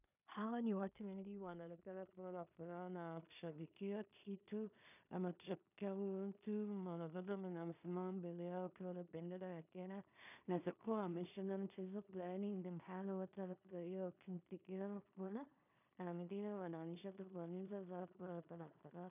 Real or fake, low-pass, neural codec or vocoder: fake; 3.6 kHz; codec, 16 kHz in and 24 kHz out, 0.4 kbps, LongCat-Audio-Codec, two codebook decoder